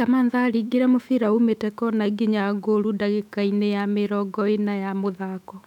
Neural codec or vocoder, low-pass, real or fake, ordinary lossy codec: autoencoder, 48 kHz, 128 numbers a frame, DAC-VAE, trained on Japanese speech; 19.8 kHz; fake; none